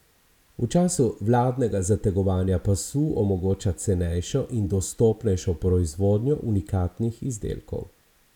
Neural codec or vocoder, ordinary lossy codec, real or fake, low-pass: none; none; real; 19.8 kHz